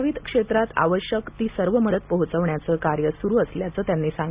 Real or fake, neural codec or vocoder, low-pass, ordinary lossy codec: real; none; 3.6 kHz; Opus, 64 kbps